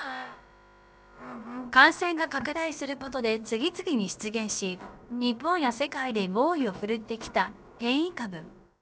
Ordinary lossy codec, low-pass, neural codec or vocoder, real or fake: none; none; codec, 16 kHz, about 1 kbps, DyCAST, with the encoder's durations; fake